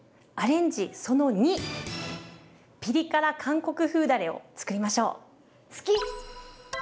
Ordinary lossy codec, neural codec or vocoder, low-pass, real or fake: none; none; none; real